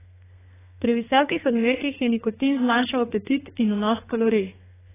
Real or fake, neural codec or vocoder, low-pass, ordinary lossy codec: fake; codec, 32 kHz, 1.9 kbps, SNAC; 3.6 kHz; AAC, 16 kbps